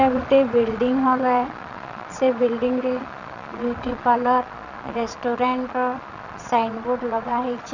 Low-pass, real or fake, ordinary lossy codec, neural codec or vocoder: 7.2 kHz; fake; none; vocoder, 22.05 kHz, 80 mel bands, Vocos